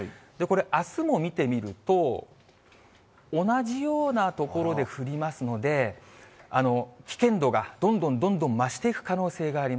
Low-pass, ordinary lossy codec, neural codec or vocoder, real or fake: none; none; none; real